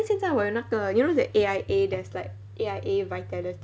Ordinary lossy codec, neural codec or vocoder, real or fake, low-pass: none; none; real; none